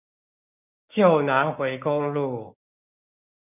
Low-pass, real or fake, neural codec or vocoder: 3.6 kHz; fake; codec, 16 kHz, 6 kbps, DAC